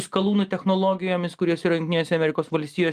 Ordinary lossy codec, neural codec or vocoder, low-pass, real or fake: Opus, 32 kbps; none; 14.4 kHz; real